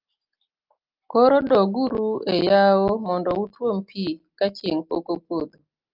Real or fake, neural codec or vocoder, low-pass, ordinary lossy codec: real; none; 5.4 kHz; Opus, 32 kbps